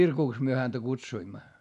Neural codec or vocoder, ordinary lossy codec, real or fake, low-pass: none; none; real; 10.8 kHz